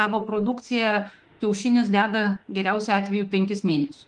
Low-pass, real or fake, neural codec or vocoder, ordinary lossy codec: 10.8 kHz; fake; autoencoder, 48 kHz, 32 numbers a frame, DAC-VAE, trained on Japanese speech; Opus, 24 kbps